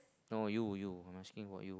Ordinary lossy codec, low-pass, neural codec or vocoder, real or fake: none; none; none; real